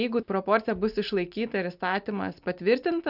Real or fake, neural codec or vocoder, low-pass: real; none; 5.4 kHz